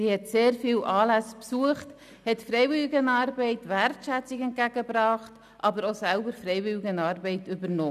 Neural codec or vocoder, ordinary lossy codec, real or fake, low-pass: none; none; real; 14.4 kHz